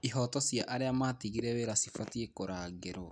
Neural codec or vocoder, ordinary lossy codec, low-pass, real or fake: none; none; 9.9 kHz; real